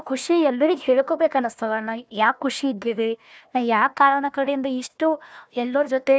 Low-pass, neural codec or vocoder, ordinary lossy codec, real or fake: none; codec, 16 kHz, 1 kbps, FunCodec, trained on Chinese and English, 50 frames a second; none; fake